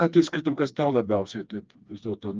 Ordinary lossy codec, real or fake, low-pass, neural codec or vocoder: Opus, 24 kbps; fake; 7.2 kHz; codec, 16 kHz, 2 kbps, FreqCodec, smaller model